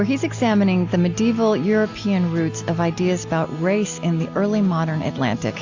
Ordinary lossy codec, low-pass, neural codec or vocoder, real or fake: AAC, 48 kbps; 7.2 kHz; none; real